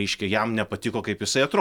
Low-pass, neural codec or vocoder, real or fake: 19.8 kHz; none; real